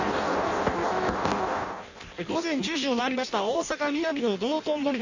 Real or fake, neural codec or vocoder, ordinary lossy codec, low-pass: fake; codec, 16 kHz in and 24 kHz out, 0.6 kbps, FireRedTTS-2 codec; none; 7.2 kHz